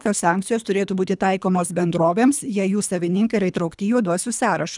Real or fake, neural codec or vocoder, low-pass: fake; codec, 24 kHz, 3 kbps, HILCodec; 10.8 kHz